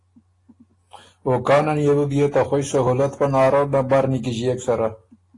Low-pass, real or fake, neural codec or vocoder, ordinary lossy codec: 10.8 kHz; real; none; AAC, 32 kbps